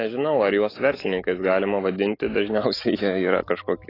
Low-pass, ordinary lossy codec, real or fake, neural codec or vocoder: 5.4 kHz; AAC, 24 kbps; fake; autoencoder, 48 kHz, 128 numbers a frame, DAC-VAE, trained on Japanese speech